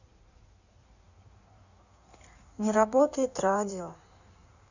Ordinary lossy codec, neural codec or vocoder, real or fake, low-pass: none; codec, 16 kHz in and 24 kHz out, 1.1 kbps, FireRedTTS-2 codec; fake; 7.2 kHz